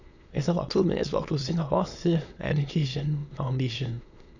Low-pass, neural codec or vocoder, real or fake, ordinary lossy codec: 7.2 kHz; autoencoder, 22.05 kHz, a latent of 192 numbers a frame, VITS, trained on many speakers; fake; none